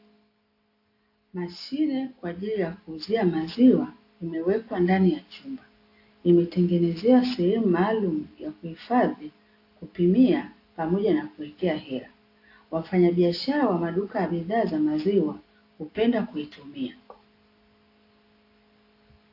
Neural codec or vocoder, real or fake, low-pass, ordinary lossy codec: none; real; 5.4 kHz; AAC, 32 kbps